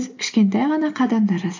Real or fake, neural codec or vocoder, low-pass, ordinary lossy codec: real; none; 7.2 kHz; AAC, 48 kbps